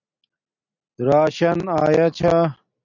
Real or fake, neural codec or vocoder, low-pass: real; none; 7.2 kHz